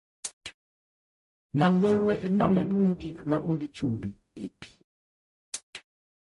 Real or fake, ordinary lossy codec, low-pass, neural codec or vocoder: fake; MP3, 48 kbps; 14.4 kHz; codec, 44.1 kHz, 0.9 kbps, DAC